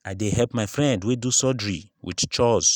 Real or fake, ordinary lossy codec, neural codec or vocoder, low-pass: real; none; none; 19.8 kHz